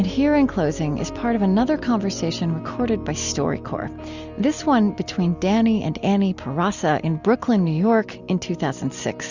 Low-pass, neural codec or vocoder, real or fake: 7.2 kHz; none; real